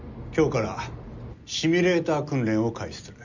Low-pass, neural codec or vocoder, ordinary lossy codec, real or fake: 7.2 kHz; none; none; real